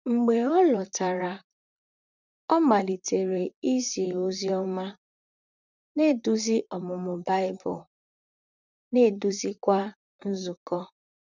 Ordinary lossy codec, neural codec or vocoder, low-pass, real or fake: none; vocoder, 44.1 kHz, 128 mel bands, Pupu-Vocoder; 7.2 kHz; fake